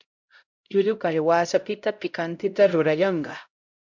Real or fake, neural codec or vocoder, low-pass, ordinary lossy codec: fake; codec, 16 kHz, 0.5 kbps, X-Codec, HuBERT features, trained on LibriSpeech; 7.2 kHz; MP3, 64 kbps